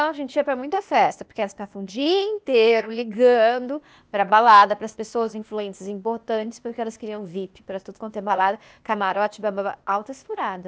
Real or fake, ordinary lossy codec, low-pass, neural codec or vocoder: fake; none; none; codec, 16 kHz, 0.8 kbps, ZipCodec